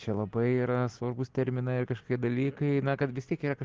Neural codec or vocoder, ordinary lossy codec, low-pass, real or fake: none; Opus, 16 kbps; 7.2 kHz; real